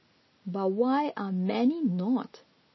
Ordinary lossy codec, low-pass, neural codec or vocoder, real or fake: MP3, 24 kbps; 7.2 kHz; none; real